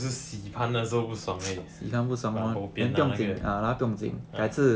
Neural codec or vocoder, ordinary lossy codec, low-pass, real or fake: none; none; none; real